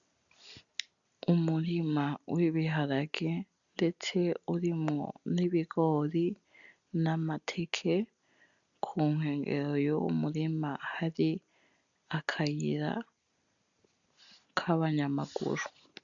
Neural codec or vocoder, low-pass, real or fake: none; 7.2 kHz; real